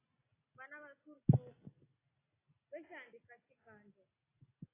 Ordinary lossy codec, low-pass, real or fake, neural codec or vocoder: AAC, 16 kbps; 3.6 kHz; real; none